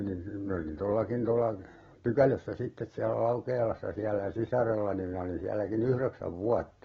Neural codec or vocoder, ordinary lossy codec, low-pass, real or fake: none; AAC, 24 kbps; 7.2 kHz; real